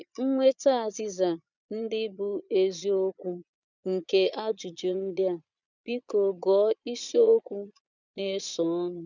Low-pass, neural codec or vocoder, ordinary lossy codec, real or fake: 7.2 kHz; vocoder, 22.05 kHz, 80 mel bands, Vocos; none; fake